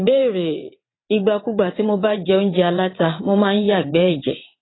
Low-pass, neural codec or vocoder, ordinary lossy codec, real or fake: 7.2 kHz; vocoder, 22.05 kHz, 80 mel bands, WaveNeXt; AAC, 16 kbps; fake